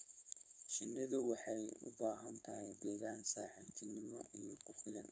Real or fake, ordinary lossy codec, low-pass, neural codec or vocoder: fake; none; none; codec, 16 kHz, 4.8 kbps, FACodec